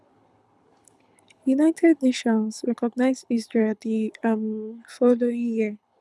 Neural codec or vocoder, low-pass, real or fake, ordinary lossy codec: codec, 24 kHz, 6 kbps, HILCodec; none; fake; none